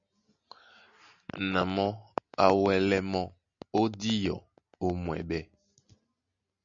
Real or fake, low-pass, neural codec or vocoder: real; 7.2 kHz; none